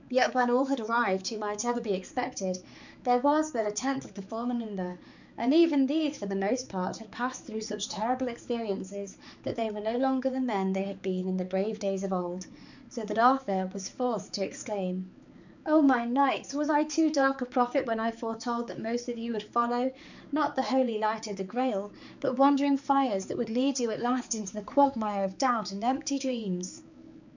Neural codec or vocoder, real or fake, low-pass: codec, 16 kHz, 4 kbps, X-Codec, HuBERT features, trained on balanced general audio; fake; 7.2 kHz